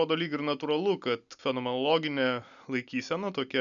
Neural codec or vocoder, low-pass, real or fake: none; 7.2 kHz; real